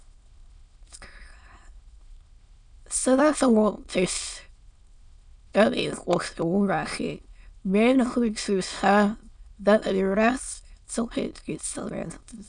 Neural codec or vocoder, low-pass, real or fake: autoencoder, 22.05 kHz, a latent of 192 numbers a frame, VITS, trained on many speakers; 9.9 kHz; fake